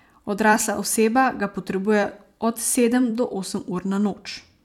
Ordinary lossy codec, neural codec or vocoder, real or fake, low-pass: none; vocoder, 44.1 kHz, 128 mel bands every 256 samples, BigVGAN v2; fake; 19.8 kHz